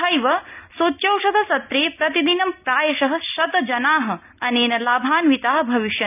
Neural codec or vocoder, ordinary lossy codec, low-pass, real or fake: none; none; 3.6 kHz; real